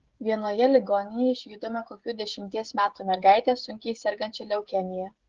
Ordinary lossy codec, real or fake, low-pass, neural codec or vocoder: Opus, 16 kbps; fake; 7.2 kHz; codec, 16 kHz, 16 kbps, FreqCodec, smaller model